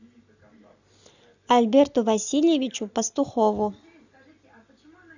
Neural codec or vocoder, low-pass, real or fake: none; 7.2 kHz; real